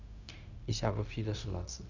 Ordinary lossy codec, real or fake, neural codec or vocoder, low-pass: none; fake; codec, 16 kHz, 0.4 kbps, LongCat-Audio-Codec; 7.2 kHz